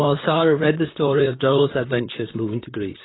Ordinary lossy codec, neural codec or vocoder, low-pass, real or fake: AAC, 16 kbps; vocoder, 44.1 kHz, 128 mel bands, Pupu-Vocoder; 7.2 kHz; fake